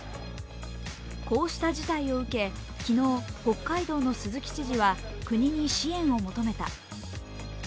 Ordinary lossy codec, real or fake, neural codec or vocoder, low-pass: none; real; none; none